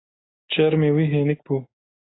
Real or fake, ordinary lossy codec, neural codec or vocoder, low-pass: real; AAC, 16 kbps; none; 7.2 kHz